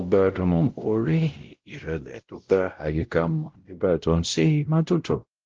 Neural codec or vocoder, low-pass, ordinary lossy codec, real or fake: codec, 16 kHz, 0.5 kbps, X-Codec, WavLM features, trained on Multilingual LibriSpeech; 7.2 kHz; Opus, 16 kbps; fake